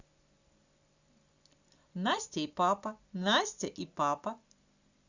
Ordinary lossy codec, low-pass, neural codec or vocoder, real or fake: Opus, 64 kbps; 7.2 kHz; none; real